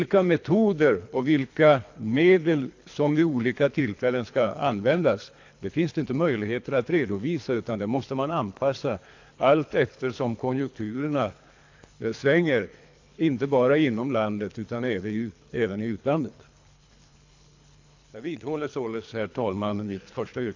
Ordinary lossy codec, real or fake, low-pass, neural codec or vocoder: AAC, 48 kbps; fake; 7.2 kHz; codec, 24 kHz, 3 kbps, HILCodec